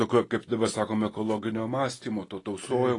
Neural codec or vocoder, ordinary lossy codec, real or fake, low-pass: none; AAC, 32 kbps; real; 10.8 kHz